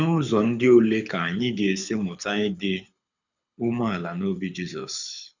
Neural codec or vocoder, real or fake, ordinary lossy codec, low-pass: codec, 24 kHz, 6 kbps, HILCodec; fake; none; 7.2 kHz